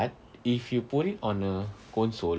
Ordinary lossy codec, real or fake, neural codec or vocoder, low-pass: none; real; none; none